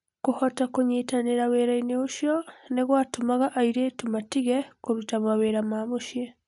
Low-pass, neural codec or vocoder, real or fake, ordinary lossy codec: 10.8 kHz; none; real; none